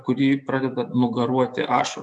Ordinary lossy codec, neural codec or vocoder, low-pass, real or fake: MP3, 96 kbps; autoencoder, 48 kHz, 128 numbers a frame, DAC-VAE, trained on Japanese speech; 10.8 kHz; fake